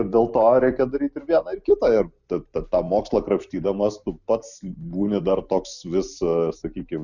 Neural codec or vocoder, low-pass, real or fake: none; 7.2 kHz; real